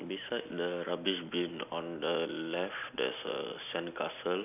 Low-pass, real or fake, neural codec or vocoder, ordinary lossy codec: 3.6 kHz; real; none; none